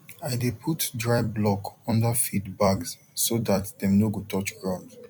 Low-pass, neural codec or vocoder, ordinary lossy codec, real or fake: 19.8 kHz; vocoder, 44.1 kHz, 128 mel bands every 256 samples, BigVGAN v2; MP3, 96 kbps; fake